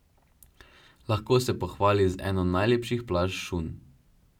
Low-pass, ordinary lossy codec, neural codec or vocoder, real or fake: 19.8 kHz; none; none; real